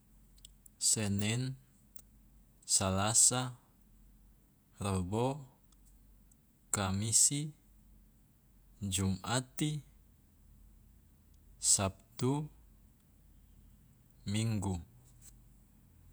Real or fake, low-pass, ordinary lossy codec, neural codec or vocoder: fake; none; none; vocoder, 48 kHz, 128 mel bands, Vocos